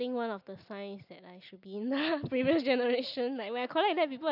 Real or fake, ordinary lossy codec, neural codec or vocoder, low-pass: real; none; none; 5.4 kHz